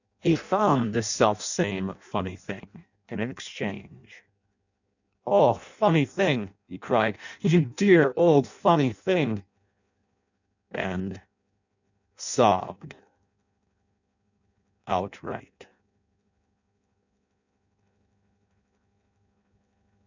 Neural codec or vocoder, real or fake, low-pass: codec, 16 kHz in and 24 kHz out, 0.6 kbps, FireRedTTS-2 codec; fake; 7.2 kHz